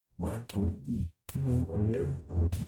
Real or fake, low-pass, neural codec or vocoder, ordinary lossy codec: fake; 19.8 kHz; codec, 44.1 kHz, 0.9 kbps, DAC; MP3, 96 kbps